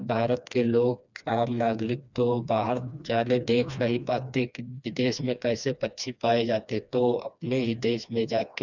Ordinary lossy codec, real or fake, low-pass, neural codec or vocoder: none; fake; 7.2 kHz; codec, 16 kHz, 2 kbps, FreqCodec, smaller model